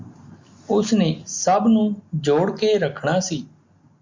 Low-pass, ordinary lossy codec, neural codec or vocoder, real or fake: 7.2 kHz; MP3, 64 kbps; none; real